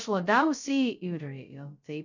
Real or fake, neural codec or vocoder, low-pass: fake; codec, 16 kHz, 0.2 kbps, FocalCodec; 7.2 kHz